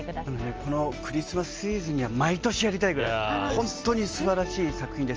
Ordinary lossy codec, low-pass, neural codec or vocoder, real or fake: Opus, 24 kbps; 7.2 kHz; none; real